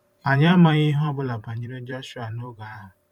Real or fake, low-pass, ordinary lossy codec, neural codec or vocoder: fake; 19.8 kHz; none; vocoder, 44.1 kHz, 128 mel bands every 512 samples, BigVGAN v2